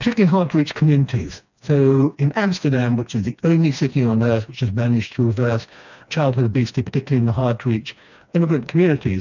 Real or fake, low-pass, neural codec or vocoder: fake; 7.2 kHz; codec, 16 kHz, 2 kbps, FreqCodec, smaller model